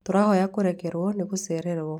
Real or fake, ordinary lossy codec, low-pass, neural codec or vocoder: fake; none; 19.8 kHz; vocoder, 44.1 kHz, 128 mel bands every 512 samples, BigVGAN v2